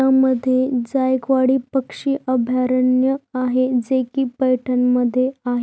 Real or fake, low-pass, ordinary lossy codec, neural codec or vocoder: real; none; none; none